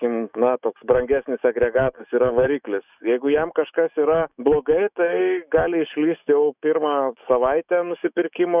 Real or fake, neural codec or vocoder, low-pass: fake; autoencoder, 48 kHz, 128 numbers a frame, DAC-VAE, trained on Japanese speech; 3.6 kHz